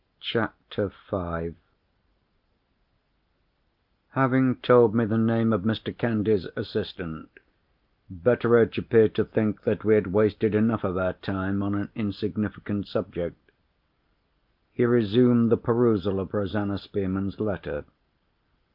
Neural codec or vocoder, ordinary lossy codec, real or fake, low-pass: none; Opus, 32 kbps; real; 5.4 kHz